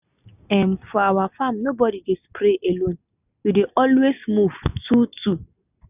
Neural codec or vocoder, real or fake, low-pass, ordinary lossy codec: none; real; 3.6 kHz; none